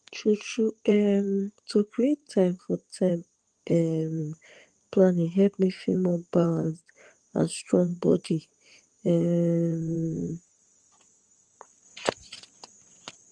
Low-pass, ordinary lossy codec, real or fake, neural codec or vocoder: 9.9 kHz; Opus, 24 kbps; fake; codec, 16 kHz in and 24 kHz out, 2.2 kbps, FireRedTTS-2 codec